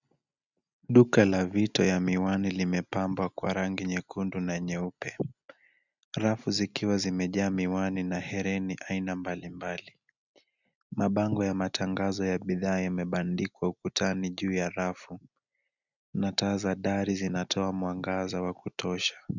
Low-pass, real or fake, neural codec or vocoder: 7.2 kHz; real; none